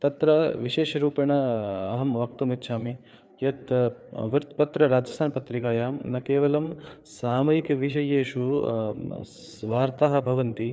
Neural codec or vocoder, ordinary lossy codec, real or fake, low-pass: codec, 16 kHz, 4 kbps, FreqCodec, larger model; none; fake; none